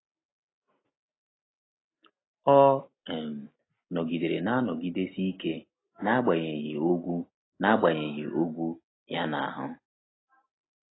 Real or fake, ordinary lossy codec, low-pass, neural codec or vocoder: real; AAC, 16 kbps; 7.2 kHz; none